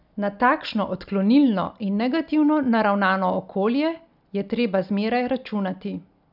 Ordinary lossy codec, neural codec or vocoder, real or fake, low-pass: none; none; real; 5.4 kHz